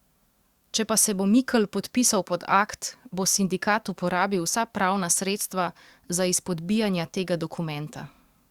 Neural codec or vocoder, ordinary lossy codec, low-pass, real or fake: codec, 44.1 kHz, 7.8 kbps, DAC; Opus, 64 kbps; 19.8 kHz; fake